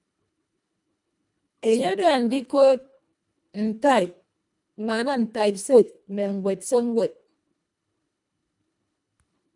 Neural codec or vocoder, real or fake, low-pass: codec, 24 kHz, 1.5 kbps, HILCodec; fake; 10.8 kHz